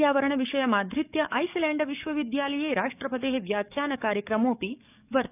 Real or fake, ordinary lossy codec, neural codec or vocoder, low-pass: fake; none; codec, 44.1 kHz, 7.8 kbps, DAC; 3.6 kHz